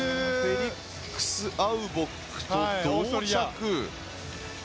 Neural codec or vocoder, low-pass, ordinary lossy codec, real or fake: none; none; none; real